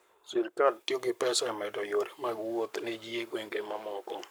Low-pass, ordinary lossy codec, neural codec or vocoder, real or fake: none; none; codec, 44.1 kHz, 7.8 kbps, Pupu-Codec; fake